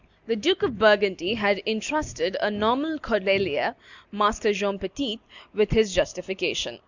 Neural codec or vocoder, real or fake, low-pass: none; real; 7.2 kHz